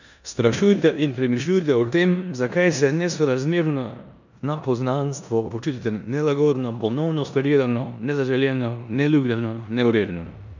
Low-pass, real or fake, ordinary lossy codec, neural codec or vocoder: 7.2 kHz; fake; none; codec, 16 kHz in and 24 kHz out, 0.9 kbps, LongCat-Audio-Codec, four codebook decoder